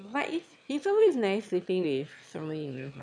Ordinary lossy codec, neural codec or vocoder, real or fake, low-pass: none; autoencoder, 22.05 kHz, a latent of 192 numbers a frame, VITS, trained on one speaker; fake; 9.9 kHz